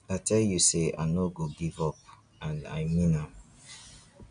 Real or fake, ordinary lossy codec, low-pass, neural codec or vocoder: real; none; 9.9 kHz; none